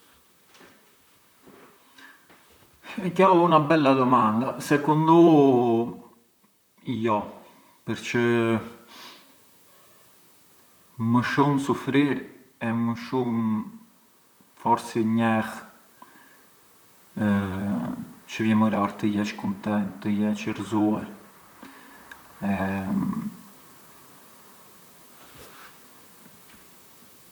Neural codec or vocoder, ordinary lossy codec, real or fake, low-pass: vocoder, 44.1 kHz, 128 mel bands, Pupu-Vocoder; none; fake; none